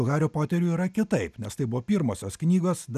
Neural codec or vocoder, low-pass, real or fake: none; 14.4 kHz; real